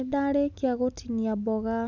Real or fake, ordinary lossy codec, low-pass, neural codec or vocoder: real; none; 7.2 kHz; none